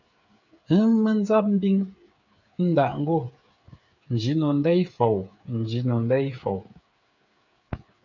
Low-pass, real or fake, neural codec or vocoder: 7.2 kHz; fake; codec, 16 kHz, 8 kbps, FreqCodec, smaller model